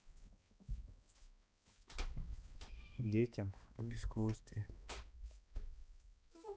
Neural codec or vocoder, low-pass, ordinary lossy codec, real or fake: codec, 16 kHz, 2 kbps, X-Codec, HuBERT features, trained on balanced general audio; none; none; fake